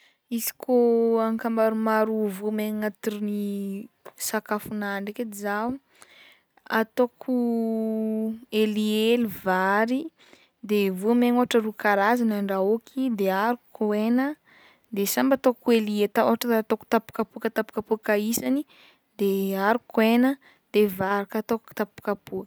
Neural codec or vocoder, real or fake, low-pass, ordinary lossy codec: none; real; none; none